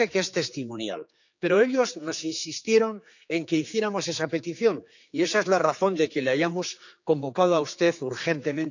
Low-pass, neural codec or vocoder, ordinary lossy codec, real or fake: 7.2 kHz; codec, 16 kHz, 4 kbps, X-Codec, HuBERT features, trained on general audio; none; fake